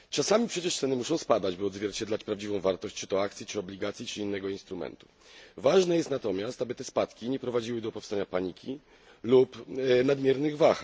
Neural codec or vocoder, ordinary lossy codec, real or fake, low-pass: none; none; real; none